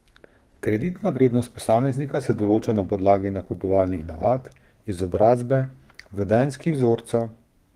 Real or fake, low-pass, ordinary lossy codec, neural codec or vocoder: fake; 14.4 kHz; Opus, 24 kbps; codec, 32 kHz, 1.9 kbps, SNAC